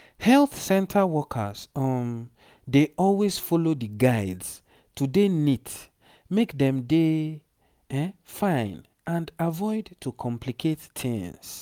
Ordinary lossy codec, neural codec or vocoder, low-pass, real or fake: none; none; 19.8 kHz; real